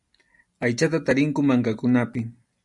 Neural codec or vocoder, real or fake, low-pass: none; real; 10.8 kHz